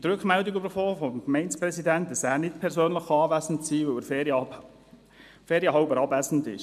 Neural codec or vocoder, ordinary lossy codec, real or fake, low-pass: none; MP3, 96 kbps; real; 14.4 kHz